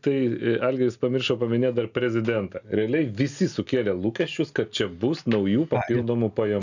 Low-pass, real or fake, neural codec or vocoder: 7.2 kHz; real; none